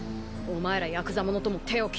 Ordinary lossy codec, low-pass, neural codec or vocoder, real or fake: none; none; none; real